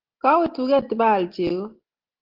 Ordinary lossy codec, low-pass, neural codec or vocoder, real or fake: Opus, 16 kbps; 5.4 kHz; none; real